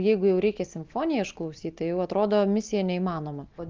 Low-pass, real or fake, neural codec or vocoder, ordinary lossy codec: 7.2 kHz; real; none; Opus, 32 kbps